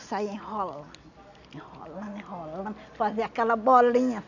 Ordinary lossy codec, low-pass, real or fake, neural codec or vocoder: none; 7.2 kHz; real; none